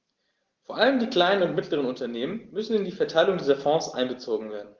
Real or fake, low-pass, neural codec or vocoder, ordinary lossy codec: real; 7.2 kHz; none; Opus, 16 kbps